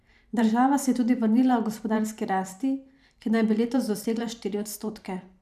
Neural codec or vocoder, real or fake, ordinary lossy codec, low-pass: vocoder, 44.1 kHz, 128 mel bands every 512 samples, BigVGAN v2; fake; none; 14.4 kHz